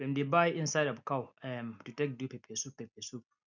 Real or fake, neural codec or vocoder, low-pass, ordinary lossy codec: real; none; 7.2 kHz; none